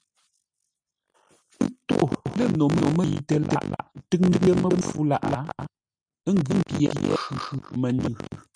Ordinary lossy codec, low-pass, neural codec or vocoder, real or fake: MP3, 96 kbps; 9.9 kHz; none; real